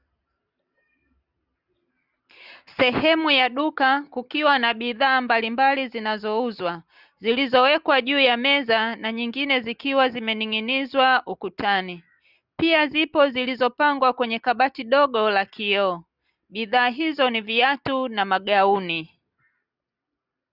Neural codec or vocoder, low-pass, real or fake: none; 5.4 kHz; real